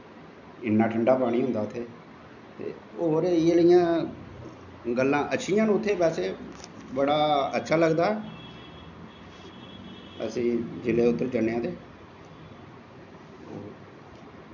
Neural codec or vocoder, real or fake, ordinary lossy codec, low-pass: none; real; none; 7.2 kHz